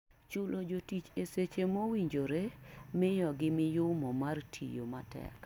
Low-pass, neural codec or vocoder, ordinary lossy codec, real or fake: 19.8 kHz; none; none; real